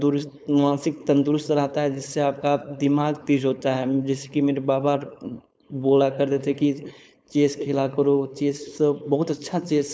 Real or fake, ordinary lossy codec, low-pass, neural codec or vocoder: fake; none; none; codec, 16 kHz, 4.8 kbps, FACodec